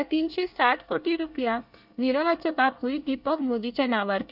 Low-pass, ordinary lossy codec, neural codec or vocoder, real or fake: 5.4 kHz; none; codec, 24 kHz, 1 kbps, SNAC; fake